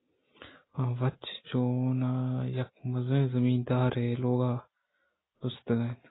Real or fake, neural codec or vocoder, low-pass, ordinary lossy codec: real; none; 7.2 kHz; AAC, 16 kbps